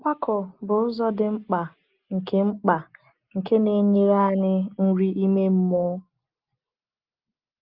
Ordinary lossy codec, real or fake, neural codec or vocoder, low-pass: Opus, 24 kbps; real; none; 5.4 kHz